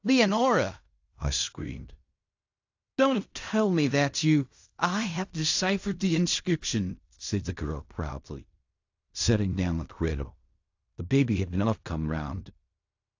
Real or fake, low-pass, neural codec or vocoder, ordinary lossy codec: fake; 7.2 kHz; codec, 16 kHz in and 24 kHz out, 0.4 kbps, LongCat-Audio-Codec, fine tuned four codebook decoder; AAC, 48 kbps